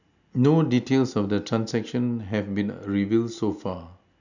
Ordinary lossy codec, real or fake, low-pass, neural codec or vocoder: none; real; 7.2 kHz; none